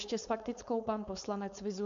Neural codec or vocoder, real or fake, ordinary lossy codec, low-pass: codec, 16 kHz, 4.8 kbps, FACodec; fake; MP3, 64 kbps; 7.2 kHz